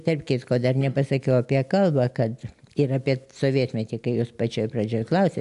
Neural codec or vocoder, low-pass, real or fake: none; 10.8 kHz; real